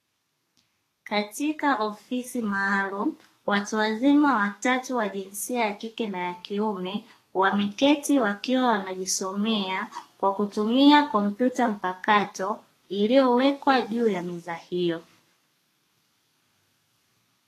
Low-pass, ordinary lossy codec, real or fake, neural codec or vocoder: 14.4 kHz; AAC, 48 kbps; fake; codec, 32 kHz, 1.9 kbps, SNAC